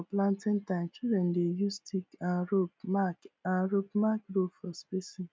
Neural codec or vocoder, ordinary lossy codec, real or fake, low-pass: none; none; real; none